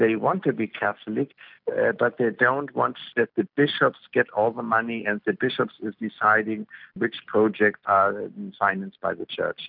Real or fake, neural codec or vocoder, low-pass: real; none; 5.4 kHz